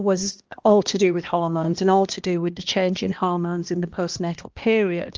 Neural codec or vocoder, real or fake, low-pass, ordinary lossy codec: codec, 16 kHz, 1 kbps, X-Codec, HuBERT features, trained on balanced general audio; fake; 7.2 kHz; Opus, 24 kbps